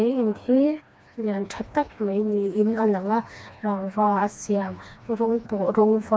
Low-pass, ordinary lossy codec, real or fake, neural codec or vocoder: none; none; fake; codec, 16 kHz, 2 kbps, FreqCodec, smaller model